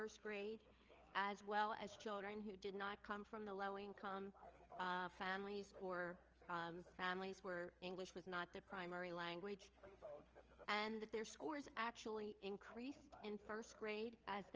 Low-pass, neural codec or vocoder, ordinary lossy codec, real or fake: 7.2 kHz; codec, 16 kHz, 4 kbps, FreqCodec, larger model; Opus, 24 kbps; fake